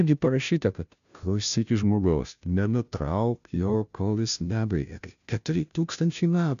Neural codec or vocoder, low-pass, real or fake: codec, 16 kHz, 0.5 kbps, FunCodec, trained on Chinese and English, 25 frames a second; 7.2 kHz; fake